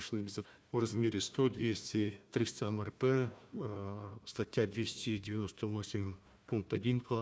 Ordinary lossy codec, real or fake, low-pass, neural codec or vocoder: none; fake; none; codec, 16 kHz, 1 kbps, FunCodec, trained on Chinese and English, 50 frames a second